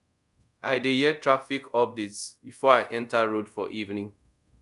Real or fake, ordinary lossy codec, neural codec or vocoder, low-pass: fake; none; codec, 24 kHz, 0.5 kbps, DualCodec; 10.8 kHz